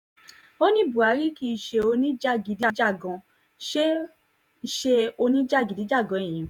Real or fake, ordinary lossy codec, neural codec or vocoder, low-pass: fake; none; vocoder, 48 kHz, 128 mel bands, Vocos; 19.8 kHz